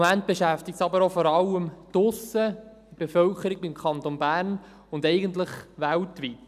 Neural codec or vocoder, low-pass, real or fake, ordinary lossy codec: none; 14.4 kHz; real; none